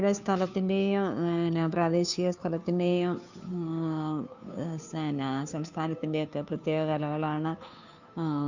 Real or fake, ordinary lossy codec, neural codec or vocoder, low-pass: fake; none; codec, 16 kHz, 2 kbps, FunCodec, trained on Chinese and English, 25 frames a second; 7.2 kHz